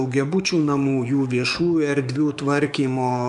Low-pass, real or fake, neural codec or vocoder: 10.8 kHz; fake; codec, 44.1 kHz, 7.8 kbps, DAC